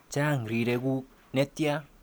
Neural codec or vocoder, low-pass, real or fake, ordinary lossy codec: none; none; real; none